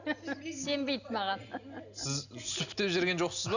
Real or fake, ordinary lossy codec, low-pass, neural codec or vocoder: real; none; 7.2 kHz; none